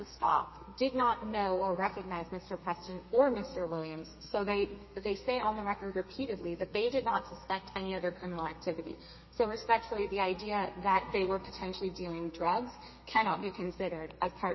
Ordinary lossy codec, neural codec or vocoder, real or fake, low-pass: MP3, 24 kbps; codec, 32 kHz, 1.9 kbps, SNAC; fake; 7.2 kHz